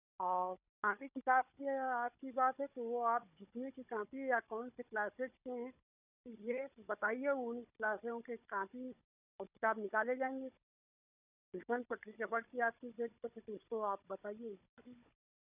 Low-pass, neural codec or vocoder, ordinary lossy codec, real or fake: 3.6 kHz; codec, 16 kHz, 16 kbps, FunCodec, trained on Chinese and English, 50 frames a second; none; fake